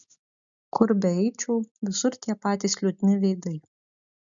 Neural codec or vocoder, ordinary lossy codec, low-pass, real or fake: none; MP3, 96 kbps; 7.2 kHz; real